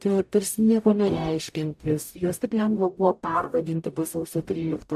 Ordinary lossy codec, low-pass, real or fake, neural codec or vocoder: AAC, 96 kbps; 14.4 kHz; fake; codec, 44.1 kHz, 0.9 kbps, DAC